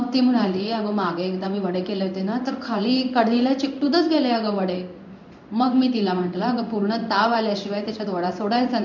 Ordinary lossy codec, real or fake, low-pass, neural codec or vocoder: none; fake; 7.2 kHz; codec, 16 kHz in and 24 kHz out, 1 kbps, XY-Tokenizer